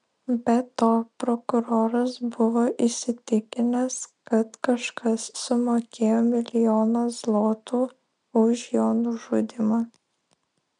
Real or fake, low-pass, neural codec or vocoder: real; 9.9 kHz; none